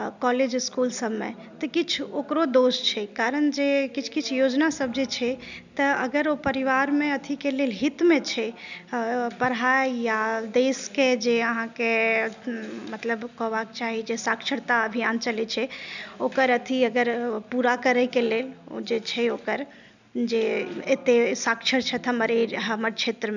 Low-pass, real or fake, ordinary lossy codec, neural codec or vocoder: 7.2 kHz; real; none; none